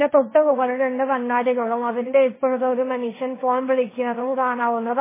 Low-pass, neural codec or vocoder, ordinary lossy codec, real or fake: 3.6 kHz; codec, 16 kHz, 1.1 kbps, Voila-Tokenizer; MP3, 16 kbps; fake